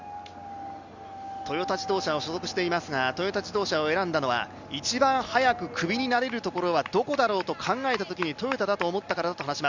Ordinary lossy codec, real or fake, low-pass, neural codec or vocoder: Opus, 64 kbps; real; 7.2 kHz; none